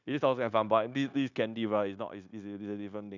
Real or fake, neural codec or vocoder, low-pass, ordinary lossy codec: fake; codec, 16 kHz, 0.9 kbps, LongCat-Audio-Codec; 7.2 kHz; none